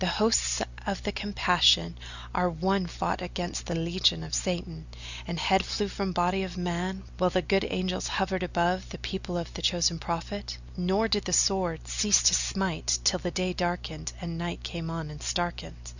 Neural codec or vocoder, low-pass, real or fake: none; 7.2 kHz; real